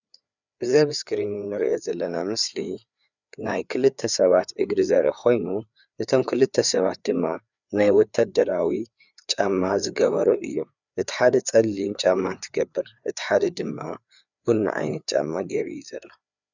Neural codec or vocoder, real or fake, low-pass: codec, 16 kHz, 4 kbps, FreqCodec, larger model; fake; 7.2 kHz